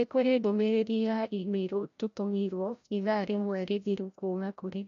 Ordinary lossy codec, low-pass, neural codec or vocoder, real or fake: none; 7.2 kHz; codec, 16 kHz, 0.5 kbps, FreqCodec, larger model; fake